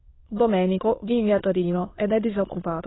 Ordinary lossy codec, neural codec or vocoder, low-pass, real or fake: AAC, 16 kbps; autoencoder, 22.05 kHz, a latent of 192 numbers a frame, VITS, trained on many speakers; 7.2 kHz; fake